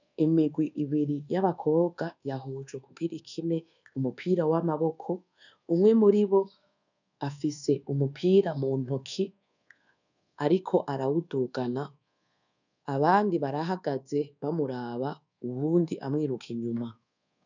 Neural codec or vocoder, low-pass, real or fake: codec, 24 kHz, 1.2 kbps, DualCodec; 7.2 kHz; fake